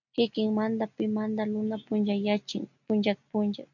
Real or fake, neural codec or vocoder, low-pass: real; none; 7.2 kHz